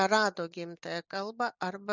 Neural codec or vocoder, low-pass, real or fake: none; 7.2 kHz; real